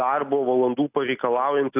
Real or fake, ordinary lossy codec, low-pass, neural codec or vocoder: real; AAC, 32 kbps; 3.6 kHz; none